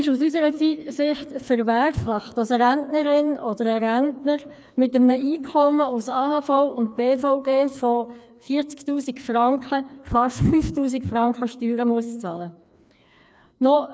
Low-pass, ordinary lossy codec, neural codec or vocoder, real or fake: none; none; codec, 16 kHz, 2 kbps, FreqCodec, larger model; fake